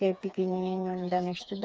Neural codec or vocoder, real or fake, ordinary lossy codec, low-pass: codec, 16 kHz, 4 kbps, FreqCodec, smaller model; fake; none; none